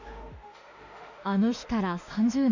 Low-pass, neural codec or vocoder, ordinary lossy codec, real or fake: 7.2 kHz; autoencoder, 48 kHz, 32 numbers a frame, DAC-VAE, trained on Japanese speech; Opus, 64 kbps; fake